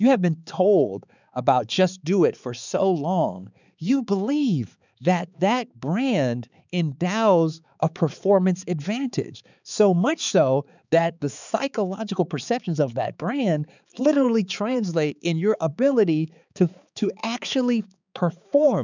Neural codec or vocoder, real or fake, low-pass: codec, 16 kHz, 4 kbps, X-Codec, HuBERT features, trained on balanced general audio; fake; 7.2 kHz